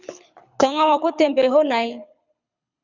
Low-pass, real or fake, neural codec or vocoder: 7.2 kHz; fake; codec, 24 kHz, 6 kbps, HILCodec